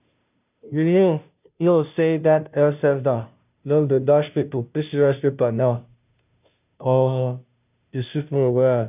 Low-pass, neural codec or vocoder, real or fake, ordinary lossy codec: 3.6 kHz; codec, 16 kHz, 0.5 kbps, FunCodec, trained on Chinese and English, 25 frames a second; fake; none